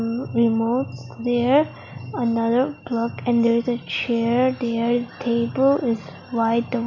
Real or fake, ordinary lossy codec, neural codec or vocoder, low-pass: real; none; none; 7.2 kHz